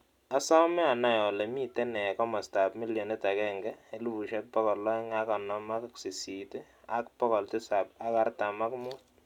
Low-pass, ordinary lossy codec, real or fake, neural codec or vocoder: 19.8 kHz; none; real; none